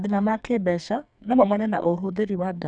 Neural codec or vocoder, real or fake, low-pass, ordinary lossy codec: codec, 44.1 kHz, 2.6 kbps, SNAC; fake; 9.9 kHz; none